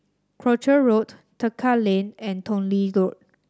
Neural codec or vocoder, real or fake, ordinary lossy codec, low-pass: none; real; none; none